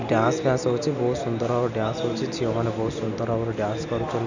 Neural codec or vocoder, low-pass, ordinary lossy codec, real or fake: none; 7.2 kHz; none; real